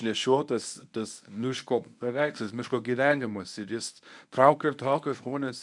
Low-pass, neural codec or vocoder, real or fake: 10.8 kHz; codec, 24 kHz, 0.9 kbps, WavTokenizer, medium speech release version 1; fake